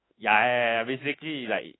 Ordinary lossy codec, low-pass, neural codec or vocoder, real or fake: AAC, 16 kbps; 7.2 kHz; autoencoder, 48 kHz, 32 numbers a frame, DAC-VAE, trained on Japanese speech; fake